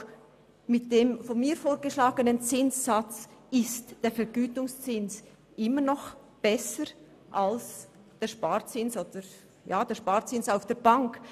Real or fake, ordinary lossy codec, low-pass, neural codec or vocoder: real; none; 14.4 kHz; none